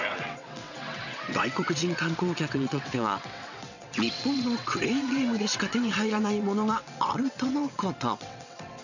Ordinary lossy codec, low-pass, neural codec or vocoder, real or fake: none; 7.2 kHz; vocoder, 22.05 kHz, 80 mel bands, WaveNeXt; fake